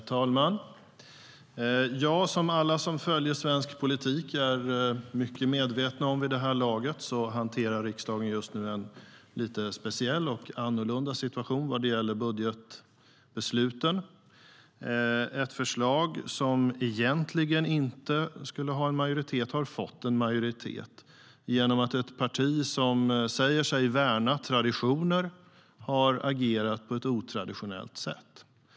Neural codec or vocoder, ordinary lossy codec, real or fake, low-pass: none; none; real; none